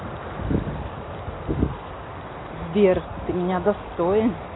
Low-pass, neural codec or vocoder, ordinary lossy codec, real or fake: 7.2 kHz; none; AAC, 16 kbps; real